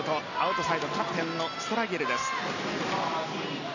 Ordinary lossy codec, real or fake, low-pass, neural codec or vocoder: none; real; 7.2 kHz; none